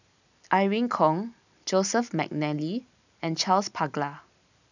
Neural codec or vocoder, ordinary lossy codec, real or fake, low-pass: none; none; real; 7.2 kHz